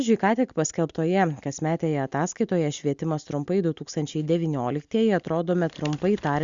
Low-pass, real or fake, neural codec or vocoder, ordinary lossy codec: 7.2 kHz; real; none; Opus, 64 kbps